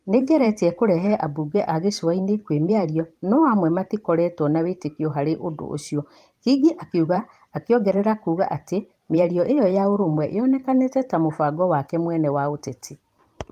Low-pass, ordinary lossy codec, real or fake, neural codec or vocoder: 14.4 kHz; Opus, 32 kbps; fake; vocoder, 44.1 kHz, 128 mel bands every 512 samples, BigVGAN v2